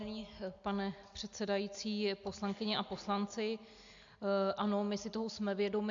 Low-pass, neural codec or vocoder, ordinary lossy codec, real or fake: 7.2 kHz; none; AAC, 64 kbps; real